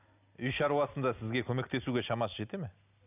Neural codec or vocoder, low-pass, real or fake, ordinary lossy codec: none; 3.6 kHz; real; none